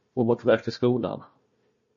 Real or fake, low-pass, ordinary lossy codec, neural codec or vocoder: fake; 7.2 kHz; MP3, 32 kbps; codec, 16 kHz, 1 kbps, FunCodec, trained on Chinese and English, 50 frames a second